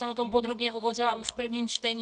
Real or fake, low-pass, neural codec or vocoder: fake; 10.8 kHz; codec, 24 kHz, 0.9 kbps, WavTokenizer, medium music audio release